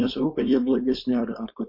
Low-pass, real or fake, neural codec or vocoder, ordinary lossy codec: 5.4 kHz; fake; codec, 16 kHz, 4.8 kbps, FACodec; MP3, 32 kbps